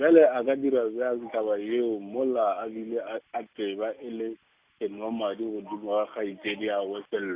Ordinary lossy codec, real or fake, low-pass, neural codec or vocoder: Opus, 64 kbps; real; 3.6 kHz; none